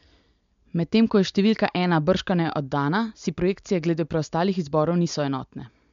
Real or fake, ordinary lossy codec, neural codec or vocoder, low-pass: real; MP3, 64 kbps; none; 7.2 kHz